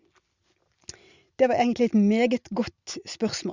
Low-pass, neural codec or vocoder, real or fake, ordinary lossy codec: 7.2 kHz; none; real; Opus, 64 kbps